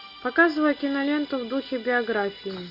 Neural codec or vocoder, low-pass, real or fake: none; 5.4 kHz; real